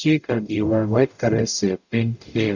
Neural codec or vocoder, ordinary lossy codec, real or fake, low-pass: codec, 44.1 kHz, 0.9 kbps, DAC; none; fake; 7.2 kHz